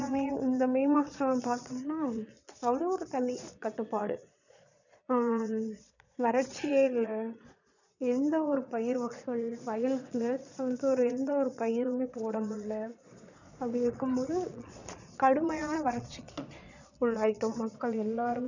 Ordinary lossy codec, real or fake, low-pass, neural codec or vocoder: none; fake; 7.2 kHz; vocoder, 22.05 kHz, 80 mel bands, Vocos